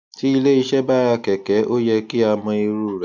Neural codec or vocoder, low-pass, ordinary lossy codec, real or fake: none; 7.2 kHz; none; real